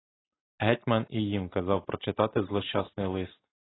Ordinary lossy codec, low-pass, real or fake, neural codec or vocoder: AAC, 16 kbps; 7.2 kHz; real; none